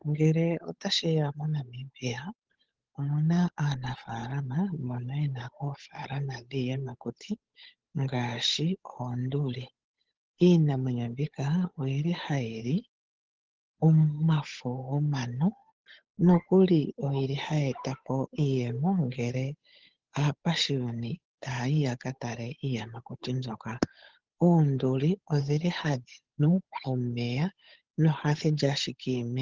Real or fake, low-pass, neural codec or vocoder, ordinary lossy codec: fake; 7.2 kHz; codec, 16 kHz, 8 kbps, FunCodec, trained on Chinese and English, 25 frames a second; Opus, 16 kbps